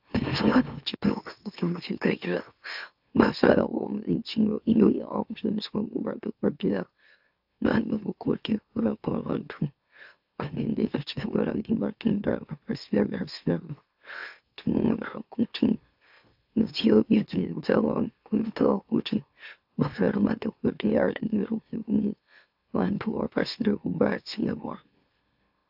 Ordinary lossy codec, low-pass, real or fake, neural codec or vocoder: AAC, 48 kbps; 5.4 kHz; fake; autoencoder, 44.1 kHz, a latent of 192 numbers a frame, MeloTTS